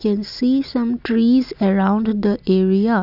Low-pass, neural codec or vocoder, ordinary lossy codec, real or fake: 5.4 kHz; none; none; real